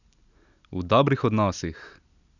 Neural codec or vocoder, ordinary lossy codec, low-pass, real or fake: none; none; 7.2 kHz; real